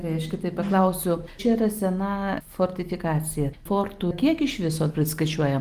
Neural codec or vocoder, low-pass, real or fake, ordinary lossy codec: none; 14.4 kHz; real; Opus, 24 kbps